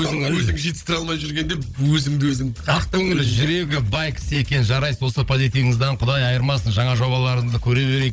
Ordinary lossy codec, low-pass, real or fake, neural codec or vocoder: none; none; fake; codec, 16 kHz, 16 kbps, FunCodec, trained on Chinese and English, 50 frames a second